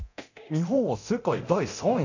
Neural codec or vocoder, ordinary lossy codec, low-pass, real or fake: codec, 24 kHz, 0.9 kbps, DualCodec; none; 7.2 kHz; fake